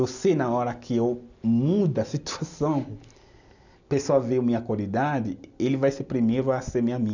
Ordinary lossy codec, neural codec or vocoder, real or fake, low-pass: none; none; real; 7.2 kHz